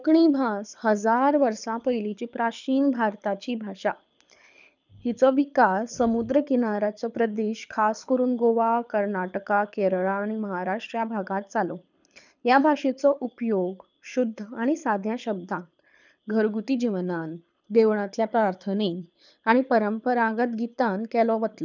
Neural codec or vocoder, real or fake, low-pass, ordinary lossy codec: codec, 24 kHz, 6 kbps, HILCodec; fake; 7.2 kHz; none